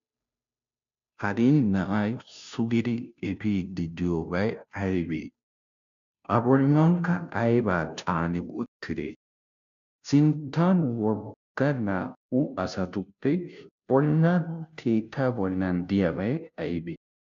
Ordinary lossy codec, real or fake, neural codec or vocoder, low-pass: AAC, 96 kbps; fake; codec, 16 kHz, 0.5 kbps, FunCodec, trained on Chinese and English, 25 frames a second; 7.2 kHz